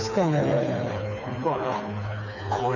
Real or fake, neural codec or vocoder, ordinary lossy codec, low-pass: fake; codec, 16 kHz, 4 kbps, FreqCodec, smaller model; none; 7.2 kHz